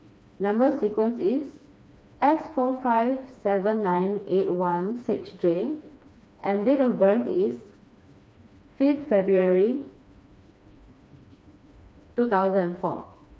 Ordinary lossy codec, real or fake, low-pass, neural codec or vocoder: none; fake; none; codec, 16 kHz, 2 kbps, FreqCodec, smaller model